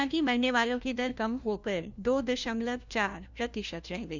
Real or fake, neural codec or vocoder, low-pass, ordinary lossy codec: fake; codec, 16 kHz, 1 kbps, FunCodec, trained on LibriTTS, 50 frames a second; 7.2 kHz; none